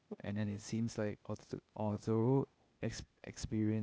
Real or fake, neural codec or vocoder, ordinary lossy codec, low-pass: fake; codec, 16 kHz, 0.8 kbps, ZipCodec; none; none